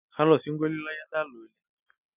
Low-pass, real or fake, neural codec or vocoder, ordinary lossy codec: 3.6 kHz; real; none; none